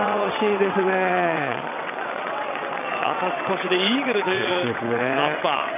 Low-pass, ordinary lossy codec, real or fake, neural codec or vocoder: 3.6 kHz; none; fake; vocoder, 22.05 kHz, 80 mel bands, WaveNeXt